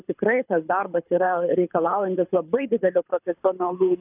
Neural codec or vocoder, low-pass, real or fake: codec, 24 kHz, 6 kbps, HILCodec; 3.6 kHz; fake